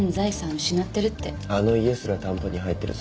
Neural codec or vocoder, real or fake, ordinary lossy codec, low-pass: none; real; none; none